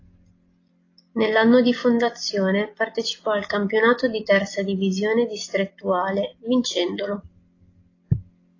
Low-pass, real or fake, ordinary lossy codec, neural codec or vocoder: 7.2 kHz; real; AAC, 32 kbps; none